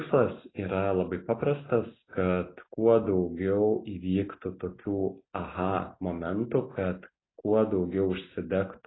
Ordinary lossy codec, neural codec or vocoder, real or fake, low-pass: AAC, 16 kbps; none; real; 7.2 kHz